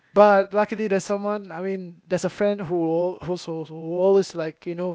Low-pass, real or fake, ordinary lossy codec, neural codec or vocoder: none; fake; none; codec, 16 kHz, 0.8 kbps, ZipCodec